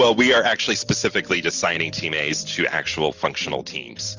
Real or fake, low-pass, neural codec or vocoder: real; 7.2 kHz; none